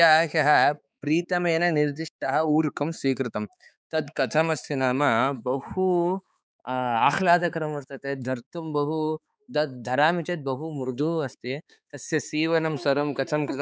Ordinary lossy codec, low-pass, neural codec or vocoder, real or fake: none; none; codec, 16 kHz, 4 kbps, X-Codec, HuBERT features, trained on balanced general audio; fake